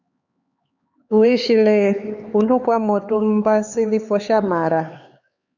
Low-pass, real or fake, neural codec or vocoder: 7.2 kHz; fake; codec, 16 kHz, 4 kbps, X-Codec, HuBERT features, trained on LibriSpeech